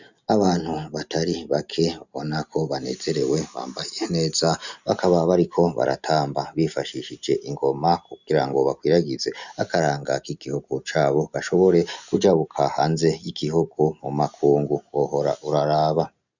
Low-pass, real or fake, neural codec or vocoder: 7.2 kHz; real; none